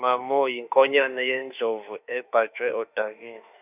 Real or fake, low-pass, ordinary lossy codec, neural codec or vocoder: fake; 3.6 kHz; none; autoencoder, 48 kHz, 32 numbers a frame, DAC-VAE, trained on Japanese speech